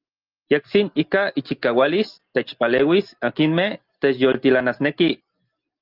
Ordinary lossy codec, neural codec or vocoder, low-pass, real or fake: Opus, 32 kbps; none; 5.4 kHz; real